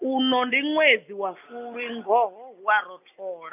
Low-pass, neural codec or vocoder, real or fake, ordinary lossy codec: 3.6 kHz; none; real; none